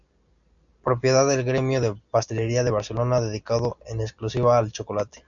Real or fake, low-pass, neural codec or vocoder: real; 7.2 kHz; none